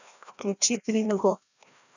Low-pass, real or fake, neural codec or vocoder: 7.2 kHz; fake; codec, 16 kHz, 1 kbps, FreqCodec, larger model